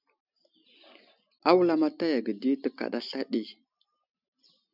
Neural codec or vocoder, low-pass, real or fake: none; 5.4 kHz; real